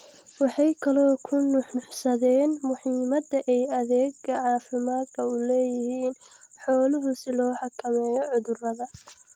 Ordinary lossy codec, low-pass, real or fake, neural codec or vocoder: Opus, 24 kbps; 19.8 kHz; real; none